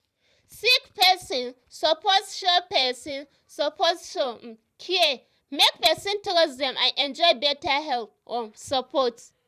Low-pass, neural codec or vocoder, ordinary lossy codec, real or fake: 14.4 kHz; vocoder, 44.1 kHz, 128 mel bands every 512 samples, BigVGAN v2; none; fake